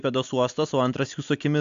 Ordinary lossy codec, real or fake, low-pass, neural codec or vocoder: AAC, 64 kbps; real; 7.2 kHz; none